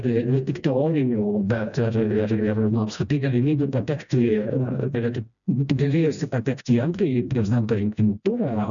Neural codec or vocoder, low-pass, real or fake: codec, 16 kHz, 1 kbps, FreqCodec, smaller model; 7.2 kHz; fake